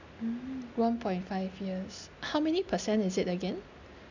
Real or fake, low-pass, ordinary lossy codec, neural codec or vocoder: real; 7.2 kHz; none; none